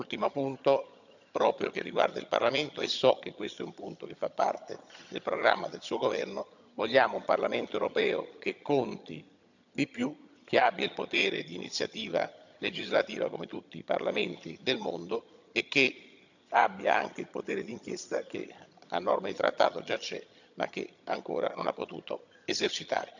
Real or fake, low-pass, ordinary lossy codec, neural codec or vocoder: fake; 7.2 kHz; none; vocoder, 22.05 kHz, 80 mel bands, HiFi-GAN